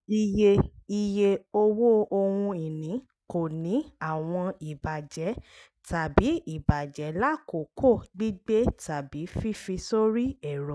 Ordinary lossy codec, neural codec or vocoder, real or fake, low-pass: none; none; real; none